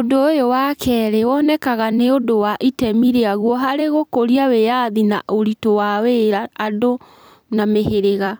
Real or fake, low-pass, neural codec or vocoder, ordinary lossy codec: fake; none; vocoder, 44.1 kHz, 128 mel bands every 256 samples, BigVGAN v2; none